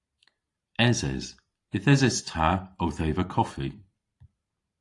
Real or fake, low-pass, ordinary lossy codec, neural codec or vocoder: fake; 10.8 kHz; AAC, 48 kbps; vocoder, 44.1 kHz, 128 mel bands every 512 samples, BigVGAN v2